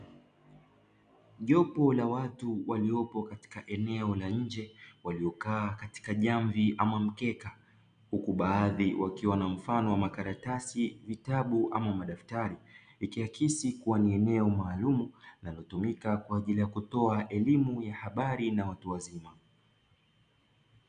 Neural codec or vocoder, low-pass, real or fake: none; 9.9 kHz; real